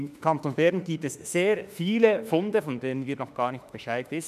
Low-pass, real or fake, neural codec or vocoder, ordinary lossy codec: 14.4 kHz; fake; autoencoder, 48 kHz, 32 numbers a frame, DAC-VAE, trained on Japanese speech; none